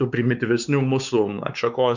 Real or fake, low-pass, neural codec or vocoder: fake; 7.2 kHz; codec, 16 kHz, 4 kbps, X-Codec, WavLM features, trained on Multilingual LibriSpeech